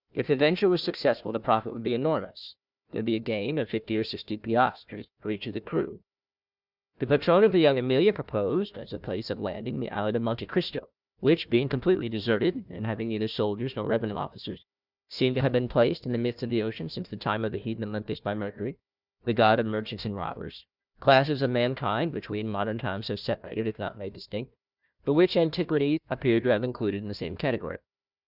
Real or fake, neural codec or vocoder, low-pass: fake; codec, 16 kHz, 1 kbps, FunCodec, trained on Chinese and English, 50 frames a second; 5.4 kHz